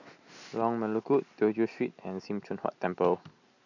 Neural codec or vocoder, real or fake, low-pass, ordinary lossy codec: none; real; 7.2 kHz; none